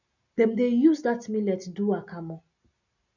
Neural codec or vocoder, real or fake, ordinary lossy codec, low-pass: none; real; none; 7.2 kHz